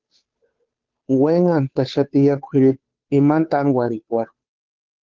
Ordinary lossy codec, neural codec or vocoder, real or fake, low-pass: Opus, 16 kbps; codec, 16 kHz, 2 kbps, FunCodec, trained on Chinese and English, 25 frames a second; fake; 7.2 kHz